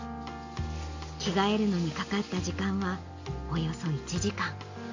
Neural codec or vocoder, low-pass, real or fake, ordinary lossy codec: none; 7.2 kHz; real; AAC, 48 kbps